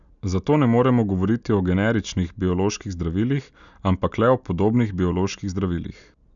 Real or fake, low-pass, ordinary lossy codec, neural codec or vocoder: real; 7.2 kHz; none; none